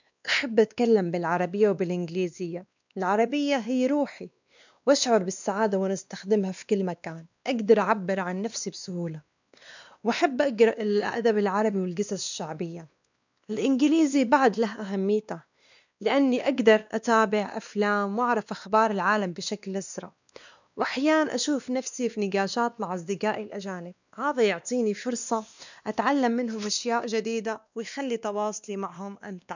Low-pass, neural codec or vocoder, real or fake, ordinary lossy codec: 7.2 kHz; codec, 16 kHz, 2 kbps, X-Codec, WavLM features, trained on Multilingual LibriSpeech; fake; none